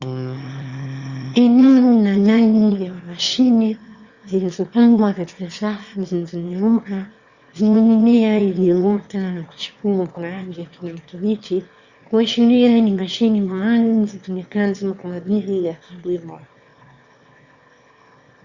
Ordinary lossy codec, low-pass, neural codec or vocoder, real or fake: Opus, 64 kbps; 7.2 kHz; autoencoder, 22.05 kHz, a latent of 192 numbers a frame, VITS, trained on one speaker; fake